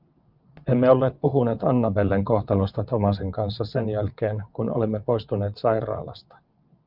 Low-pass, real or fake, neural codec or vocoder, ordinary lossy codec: 5.4 kHz; fake; vocoder, 22.05 kHz, 80 mel bands, WaveNeXt; Opus, 24 kbps